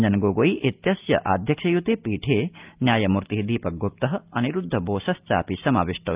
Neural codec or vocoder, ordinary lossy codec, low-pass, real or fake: none; Opus, 24 kbps; 3.6 kHz; real